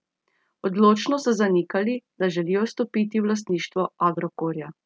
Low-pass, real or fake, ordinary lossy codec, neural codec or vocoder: none; real; none; none